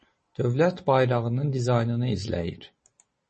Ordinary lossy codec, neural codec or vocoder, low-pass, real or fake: MP3, 32 kbps; none; 10.8 kHz; real